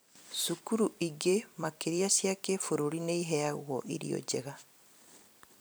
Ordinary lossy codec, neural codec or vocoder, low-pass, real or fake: none; vocoder, 44.1 kHz, 128 mel bands every 256 samples, BigVGAN v2; none; fake